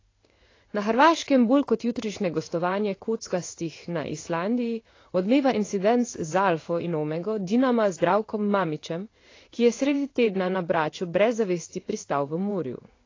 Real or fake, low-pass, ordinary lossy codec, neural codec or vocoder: fake; 7.2 kHz; AAC, 32 kbps; codec, 16 kHz in and 24 kHz out, 1 kbps, XY-Tokenizer